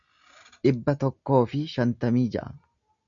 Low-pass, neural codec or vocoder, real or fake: 7.2 kHz; none; real